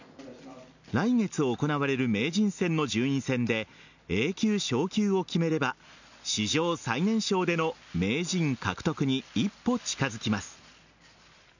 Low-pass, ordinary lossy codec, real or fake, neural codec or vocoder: 7.2 kHz; none; real; none